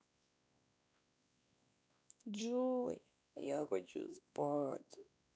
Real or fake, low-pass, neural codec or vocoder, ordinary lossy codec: fake; none; codec, 16 kHz, 2 kbps, X-Codec, WavLM features, trained on Multilingual LibriSpeech; none